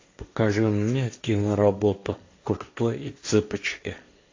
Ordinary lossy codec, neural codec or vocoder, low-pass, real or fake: AAC, 48 kbps; codec, 16 kHz, 1.1 kbps, Voila-Tokenizer; 7.2 kHz; fake